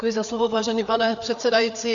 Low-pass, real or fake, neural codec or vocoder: 7.2 kHz; fake; codec, 16 kHz, 4 kbps, FreqCodec, larger model